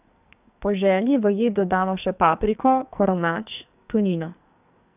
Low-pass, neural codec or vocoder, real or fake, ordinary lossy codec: 3.6 kHz; codec, 44.1 kHz, 2.6 kbps, SNAC; fake; none